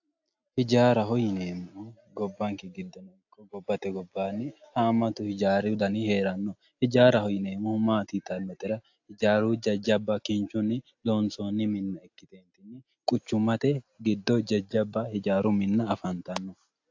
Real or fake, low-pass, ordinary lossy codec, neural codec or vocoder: real; 7.2 kHz; AAC, 48 kbps; none